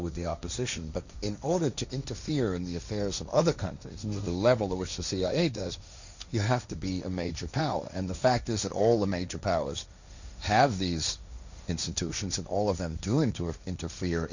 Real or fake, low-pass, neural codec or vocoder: fake; 7.2 kHz; codec, 16 kHz, 1.1 kbps, Voila-Tokenizer